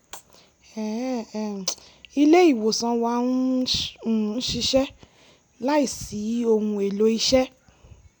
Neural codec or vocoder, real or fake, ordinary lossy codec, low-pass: none; real; none; 19.8 kHz